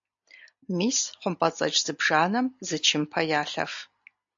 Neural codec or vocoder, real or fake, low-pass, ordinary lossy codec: none; real; 7.2 kHz; AAC, 64 kbps